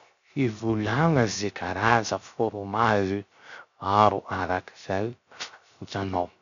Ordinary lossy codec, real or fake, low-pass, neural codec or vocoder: none; fake; 7.2 kHz; codec, 16 kHz, 0.3 kbps, FocalCodec